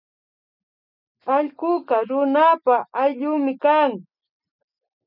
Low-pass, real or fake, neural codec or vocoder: 5.4 kHz; real; none